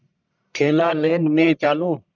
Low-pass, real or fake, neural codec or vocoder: 7.2 kHz; fake; codec, 44.1 kHz, 1.7 kbps, Pupu-Codec